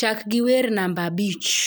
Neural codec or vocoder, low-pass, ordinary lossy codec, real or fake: none; none; none; real